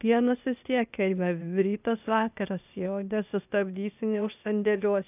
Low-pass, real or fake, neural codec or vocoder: 3.6 kHz; fake; codec, 16 kHz, 0.8 kbps, ZipCodec